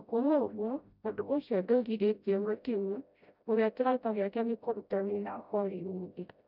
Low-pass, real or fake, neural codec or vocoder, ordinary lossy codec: 5.4 kHz; fake; codec, 16 kHz, 0.5 kbps, FreqCodec, smaller model; none